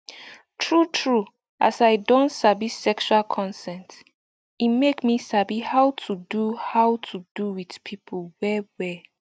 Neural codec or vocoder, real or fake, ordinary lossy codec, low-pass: none; real; none; none